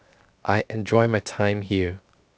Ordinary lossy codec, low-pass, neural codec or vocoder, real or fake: none; none; codec, 16 kHz, 0.7 kbps, FocalCodec; fake